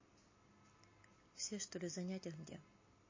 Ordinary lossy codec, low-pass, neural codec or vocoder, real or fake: MP3, 32 kbps; 7.2 kHz; none; real